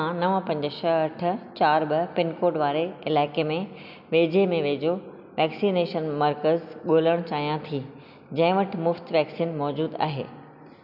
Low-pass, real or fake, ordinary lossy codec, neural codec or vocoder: 5.4 kHz; real; none; none